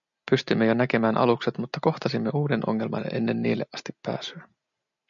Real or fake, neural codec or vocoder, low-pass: real; none; 7.2 kHz